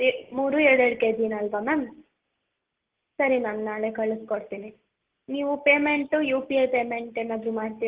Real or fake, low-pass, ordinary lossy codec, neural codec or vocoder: real; 3.6 kHz; Opus, 16 kbps; none